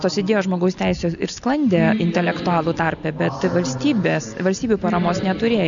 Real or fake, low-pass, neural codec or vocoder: real; 7.2 kHz; none